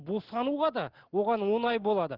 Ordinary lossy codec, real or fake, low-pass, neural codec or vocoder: Opus, 16 kbps; real; 5.4 kHz; none